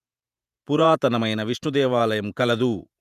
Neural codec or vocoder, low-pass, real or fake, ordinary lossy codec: vocoder, 48 kHz, 128 mel bands, Vocos; 14.4 kHz; fake; none